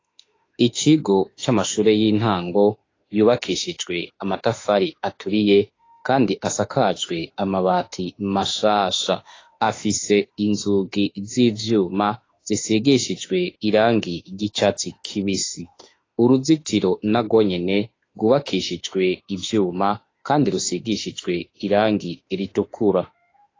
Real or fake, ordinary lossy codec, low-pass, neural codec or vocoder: fake; AAC, 32 kbps; 7.2 kHz; autoencoder, 48 kHz, 32 numbers a frame, DAC-VAE, trained on Japanese speech